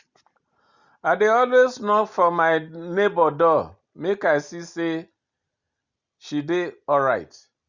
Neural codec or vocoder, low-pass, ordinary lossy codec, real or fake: none; 7.2 kHz; Opus, 64 kbps; real